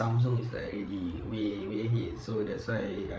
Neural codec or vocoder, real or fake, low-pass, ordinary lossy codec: codec, 16 kHz, 8 kbps, FreqCodec, larger model; fake; none; none